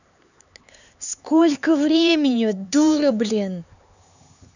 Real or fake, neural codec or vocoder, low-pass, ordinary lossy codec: fake; codec, 16 kHz, 4 kbps, X-Codec, HuBERT features, trained on LibriSpeech; 7.2 kHz; none